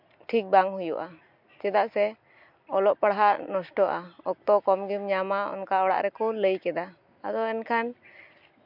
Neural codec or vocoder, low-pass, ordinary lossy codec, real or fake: none; 5.4 kHz; MP3, 48 kbps; real